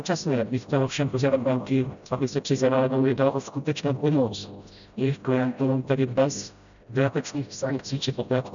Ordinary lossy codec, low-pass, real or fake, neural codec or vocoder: MP3, 96 kbps; 7.2 kHz; fake; codec, 16 kHz, 0.5 kbps, FreqCodec, smaller model